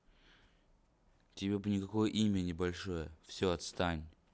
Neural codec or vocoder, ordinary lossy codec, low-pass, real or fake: none; none; none; real